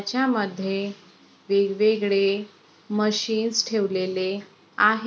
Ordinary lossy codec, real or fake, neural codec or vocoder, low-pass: none; real; none; none